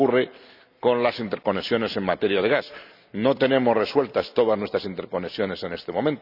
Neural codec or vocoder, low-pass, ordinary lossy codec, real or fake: none; 5.4 kHz; none; real